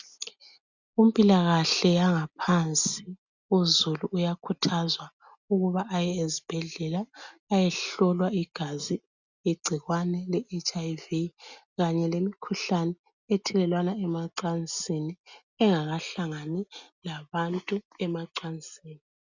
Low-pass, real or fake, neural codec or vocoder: 7.2 kHz; real; none